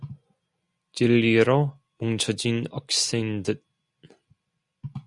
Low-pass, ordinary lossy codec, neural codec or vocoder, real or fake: 10.8 kHz; Opus, 64 kbps; none; real